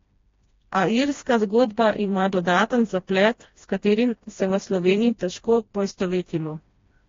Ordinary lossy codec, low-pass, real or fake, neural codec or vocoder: AAC, 32 kbps; 7.2 kHz; fake; codec, 16 kHz, 1 kbps, FreqCodec, smaller model